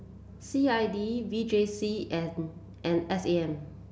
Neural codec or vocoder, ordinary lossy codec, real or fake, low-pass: none; none; real; none